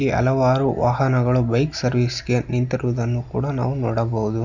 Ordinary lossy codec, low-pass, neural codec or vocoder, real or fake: none; 7.2 kHz; none; real